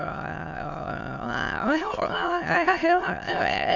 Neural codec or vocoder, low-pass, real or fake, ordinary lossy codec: autoencoder, 22.05 kHz, a latent of 192 numbers a frame, VITS, trained on many speakers; 7.2 kHz; fake; none